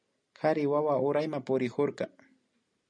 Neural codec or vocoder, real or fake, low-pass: none; real; 9.9 kHz